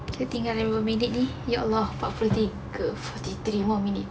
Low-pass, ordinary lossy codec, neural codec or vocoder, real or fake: none; none; none; real